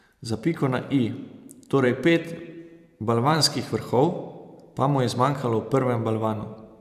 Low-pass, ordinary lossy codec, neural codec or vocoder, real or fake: 14.4 kHz; none; vocoder, 44.1 kHz, 128 mel bands every 512 samples, BigVGAN v2; fake